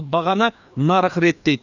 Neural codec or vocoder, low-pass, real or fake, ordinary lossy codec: codec, 16 kHz, 2 kbps, FreqCodec, larger model; 7.2 kHz; fake; none